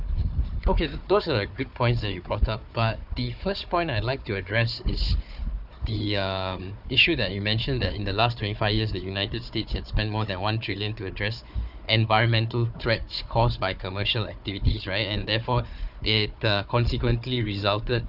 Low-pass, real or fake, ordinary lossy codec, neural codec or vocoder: 5.4 kHz; fake; none; codec, 16 kHz, 4 kbps, FunCodec, trained on Chinese and English, 50 frames a second